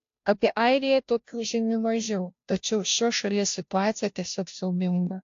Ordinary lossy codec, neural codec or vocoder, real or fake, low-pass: AAC, 48 kbps; codec, 16 kHz, 0.5 kbps, FunCodec, trained on Chinese and English, 25 frames a second; fake; 7.2 kHz